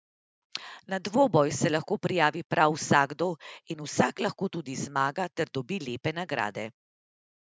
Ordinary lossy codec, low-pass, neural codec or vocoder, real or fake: none; none; none; real